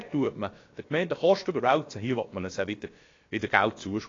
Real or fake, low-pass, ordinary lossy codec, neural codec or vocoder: fake; 7.2 kHz; AAC, 32 kbps; codec, 16 kHz, about 1 kbps, DyCAST, with the encoder's durations